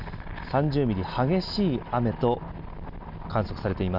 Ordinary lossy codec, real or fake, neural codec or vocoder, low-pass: none; real; none; 5.4 kHz